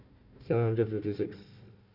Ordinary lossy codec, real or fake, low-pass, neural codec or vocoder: none; fake; 5.4 kHz; codec, 16 kHz, 1 kbps, FunCodec, trained on Chinese and English, 50 frames a second